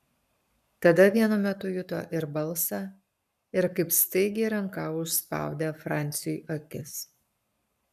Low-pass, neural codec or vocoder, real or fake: 14.4 kHz; codec, 44.1 kHz, 7.8 kbps, Pupu-Codec; fake